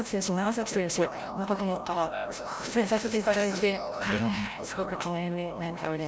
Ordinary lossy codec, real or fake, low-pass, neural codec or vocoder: none; fake; none; codec, 16 kHz, 0.5 kbps, FreqCodec, larger model